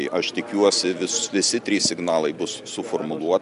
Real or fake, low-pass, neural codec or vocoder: real; 10.8 kHz; none